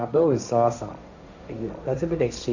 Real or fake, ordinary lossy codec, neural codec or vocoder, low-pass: fake; none; codec, 16 kHz, 1.1 kbps, Voila-Tokenizer; 7.2 kHz